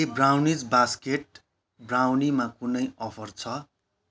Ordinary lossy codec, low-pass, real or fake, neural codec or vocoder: none; none; real; none